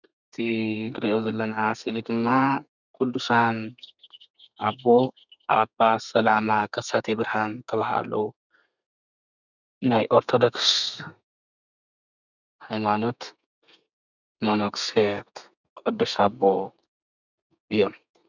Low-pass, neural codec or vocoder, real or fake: 7.2 kHz; codec, 32 kHz, 1.9 kbps, SNAC; fake